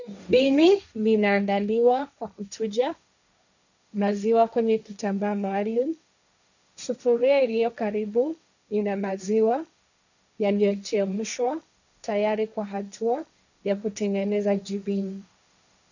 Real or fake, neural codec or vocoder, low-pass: fake; codec, 16 kHz, 1.1 kbps, Voila-Tokenizer; 7.2 kHz